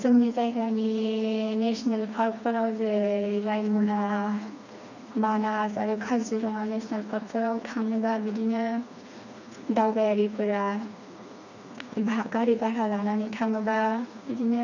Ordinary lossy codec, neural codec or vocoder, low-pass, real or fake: none; codec, 16 kHz, 2 kbps, FreqCodec, smaller model; 7.2 kHz; fake